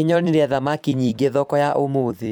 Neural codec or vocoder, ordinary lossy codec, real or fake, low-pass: vocoder, 44.1 kHz, 128 mel bands every 256 samples, BigVGAN v2; MP3, 96 kbps; fake; 19.8 kHz